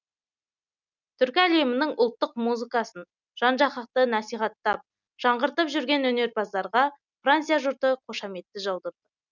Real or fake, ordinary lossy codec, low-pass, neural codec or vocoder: real; none; 7.2 kHz; none